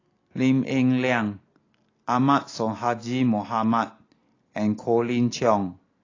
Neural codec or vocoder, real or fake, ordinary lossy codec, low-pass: none; real; AAC, 32 kbps; 7.2 kHz